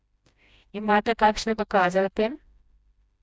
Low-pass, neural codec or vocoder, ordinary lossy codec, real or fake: none; codec, 16 kHz, 1 kbps, FreqCodec, smaller model; none; fake